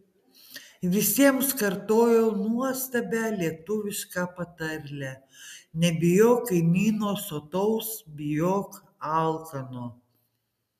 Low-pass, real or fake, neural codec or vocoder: 14.4 kHz; real; none